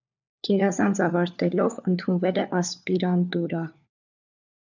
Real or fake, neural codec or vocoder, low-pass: fake; codec, 16 kHz, 4 kbps, FunCodec, trained on LibriTTS, 50 frames a second; 7.2 kHz